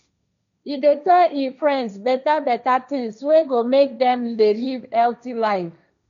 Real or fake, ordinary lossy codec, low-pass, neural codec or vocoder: fake; none; 7.2 kHz; codec, 16 kHz, 1.1 kbps, Voila-Tokenizer